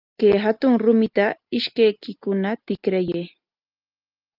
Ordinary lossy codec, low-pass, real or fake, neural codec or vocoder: Opus, 24 kbps; 5.4 kHz; real; none